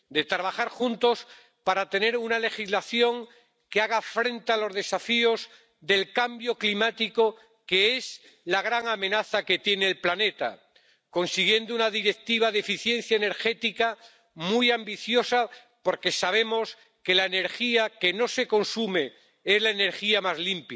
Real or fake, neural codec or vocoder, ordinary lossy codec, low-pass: real; none; none; none